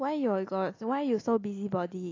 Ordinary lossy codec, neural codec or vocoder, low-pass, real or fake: AAC, 32 kbps; none; 7.2 kHz; real